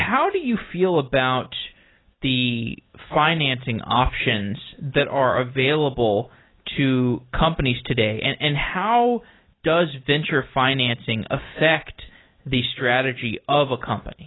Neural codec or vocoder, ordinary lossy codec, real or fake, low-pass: none; AAC, 16 kbps; real; 7.2 kHz